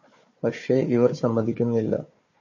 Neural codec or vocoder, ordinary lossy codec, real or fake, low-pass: codec, 16 kHz, 4 kbps, FunCodec, trained on Chinese and English, 50 frames a second; MP3, 32 kbps; fake; 7.2 kHz